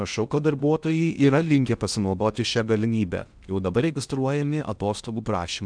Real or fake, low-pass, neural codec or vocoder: fake; 9.9 kHz; codec, 16 kHz in and 24 kHz out, 0.6 kbps, FocalCodec, streaming, 2048 codes